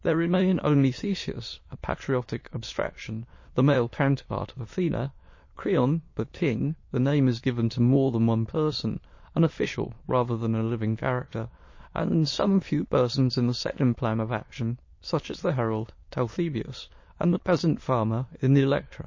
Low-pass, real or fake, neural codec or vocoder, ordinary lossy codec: 7.2 kHz; fake; autoencoder, 22.05 kHz, a latent of 192 numbers a frame, VITS, trained on many speakers; MP3, 32 kbps